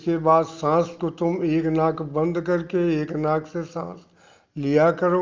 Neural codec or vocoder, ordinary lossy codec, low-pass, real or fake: none; Opus, 24 kbps; 7.2 kHz; real